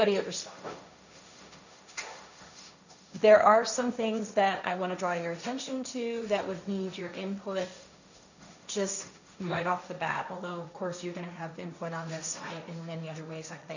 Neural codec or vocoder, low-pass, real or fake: codec, 16 kHz, 1.1 kbps, Voila-Tokenizer; 7.2 kHz; fake